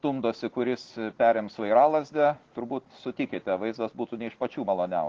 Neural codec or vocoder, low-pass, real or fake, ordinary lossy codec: none; 7.2 kHz; real; Opus, 16 kbps